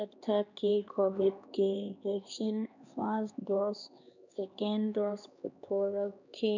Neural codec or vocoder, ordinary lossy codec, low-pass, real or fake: codec, 16 kHz, 2 kbps, X-Codec, HuBERT features, trained on LibriSpeech; none; 7.2 kHz; fake